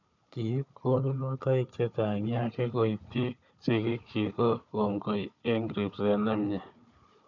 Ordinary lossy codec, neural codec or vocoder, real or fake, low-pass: none; codec, 16 kHz, 4 kbps, FunCodec, trained on Chinese and English, 50 frames a second; fake; 7.2 kHz